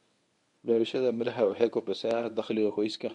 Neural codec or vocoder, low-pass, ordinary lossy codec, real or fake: codec, 24 kHz, 0.9 kbps, WavTokenizer, medium speech release version 1; 10.8 kHz; none; fake